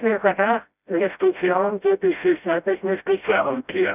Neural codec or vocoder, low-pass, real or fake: codec, 16 kHz, 0.5 kbps, FreqCodec, smaller model; 3.6 kHz; fake